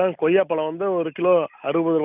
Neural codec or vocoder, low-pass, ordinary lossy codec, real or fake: none; 3.6 kHz; none; real